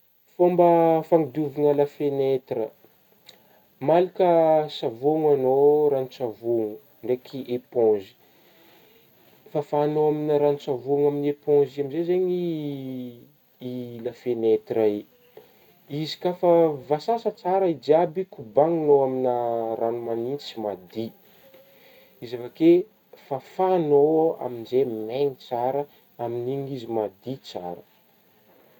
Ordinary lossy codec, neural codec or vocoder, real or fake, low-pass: none; none; real; 19.8 kHz